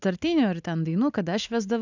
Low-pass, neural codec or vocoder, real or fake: 7.2 kHz; none; real